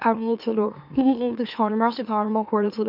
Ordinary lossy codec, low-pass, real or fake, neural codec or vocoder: none; 5.4 kHz; fake; autoencoder, 44.1 kHz, a latent of 192 numbers a frame, MeloTTS